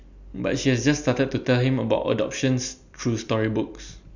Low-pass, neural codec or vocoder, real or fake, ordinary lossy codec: 7.2 kHz; none; real; none